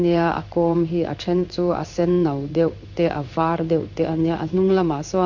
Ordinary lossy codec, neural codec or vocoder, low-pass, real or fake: none; codec, 16 kHz in and 24 kHz out, 1 kbps, XY-Tokenizer; 7.2 kHz; fake